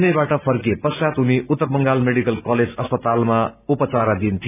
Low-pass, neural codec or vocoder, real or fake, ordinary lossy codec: 3.6 kHz; none; real; none